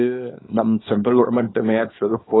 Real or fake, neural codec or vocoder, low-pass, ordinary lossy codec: fake; codec, 24 kHz, 0.9 kbps, WavTokenizer, small release; 7.2 kHz; AAC, 16 kbps